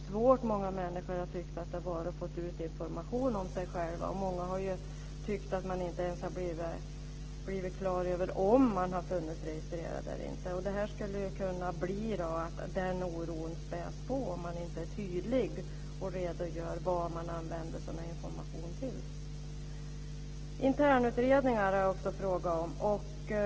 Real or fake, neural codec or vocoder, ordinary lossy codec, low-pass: real; none; Opus, 16 kbps; 7.2 kHz